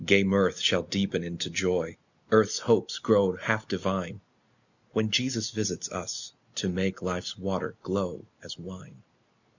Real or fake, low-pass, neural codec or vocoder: real; 7.2 kHz; none